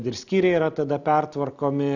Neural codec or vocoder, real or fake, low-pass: none; real; 7.2 kHz